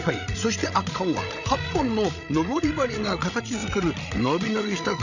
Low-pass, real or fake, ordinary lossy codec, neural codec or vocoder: 7.2 kHz; fake; none; codec, 16 kHz, 16 kbps, FreqCodec, larger model